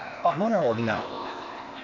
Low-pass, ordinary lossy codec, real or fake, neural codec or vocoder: 7.2 kHz; none; fake; codec, 16 kHz, 0.8 kbps, ZipCodec